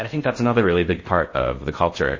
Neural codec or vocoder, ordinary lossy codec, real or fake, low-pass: codec, 16 kHz in and 24 kHz out, 0.6 kbps, FocalCodec, streaming, 2048 codes; MP3, 32 kbps; fake; 7.2 kHz